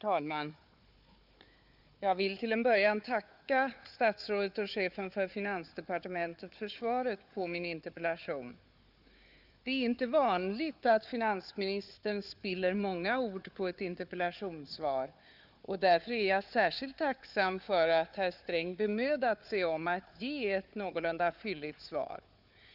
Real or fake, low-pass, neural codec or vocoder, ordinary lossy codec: fake; 5.4 kHz; codec, 16 kHz, 4 kbps, FunCodec, trained on Chinese and English, 50 frames a second; none